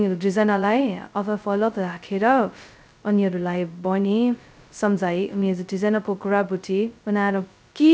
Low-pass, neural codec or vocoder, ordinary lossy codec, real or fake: none; codec, 16 kHz, 0.2 kbps, FocalCodec; none; fake